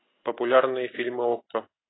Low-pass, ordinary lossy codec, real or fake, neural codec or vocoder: 7.2 kHz; AAC, 16 kbps; real; none